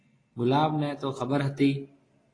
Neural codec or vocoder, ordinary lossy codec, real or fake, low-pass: none; AAC, 32 kbps; real; 9.9 kHz